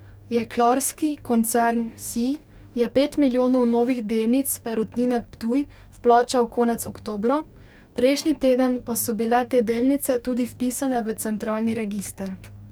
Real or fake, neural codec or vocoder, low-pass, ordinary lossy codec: fake; codec, 44.1 kHz, 2.6 kbps, DAC; none; none